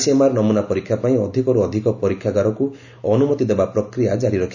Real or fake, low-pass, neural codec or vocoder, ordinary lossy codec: real; 7.2 kHz; none; none